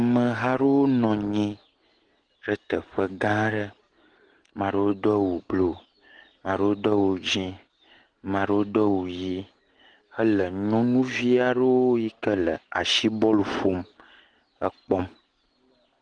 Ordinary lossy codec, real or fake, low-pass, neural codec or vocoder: Opus, 16 kbps; real; 9.9 kHz; none